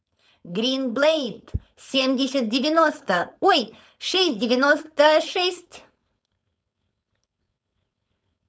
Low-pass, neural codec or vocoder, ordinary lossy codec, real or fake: none; codec, 16 kHz, 4.8 kbps, FACodec; none; fake